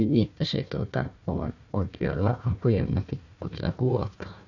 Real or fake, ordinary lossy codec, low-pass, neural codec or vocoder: fake; none; 7.2 kHz; codec, 16 kHz, 1 kbps, FunCodec, trained on Chinese and English, 50 frames a second